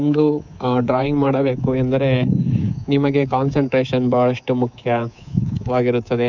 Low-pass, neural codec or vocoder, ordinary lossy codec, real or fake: 7.2 kHz; codec, 24 kHz, 3.1 kbps, DualCodec; none; fake